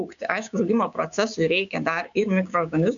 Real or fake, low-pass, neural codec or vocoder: fake; 7.2 kHz; codec, 16 kHz, 6 kbps, DAC